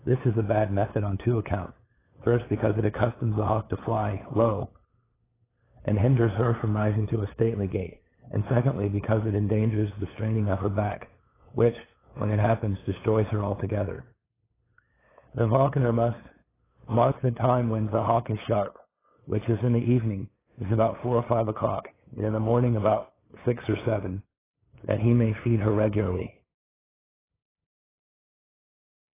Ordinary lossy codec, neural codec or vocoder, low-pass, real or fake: AAC, 16 kbps; codec, 16 kHz, 8 kbps, FunCodec, trained on LibriTTS, 25 frames a second; 3.6 kHz; fake